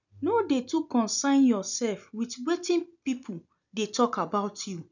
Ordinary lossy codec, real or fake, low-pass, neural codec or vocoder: none; real; 7.2 kHz; none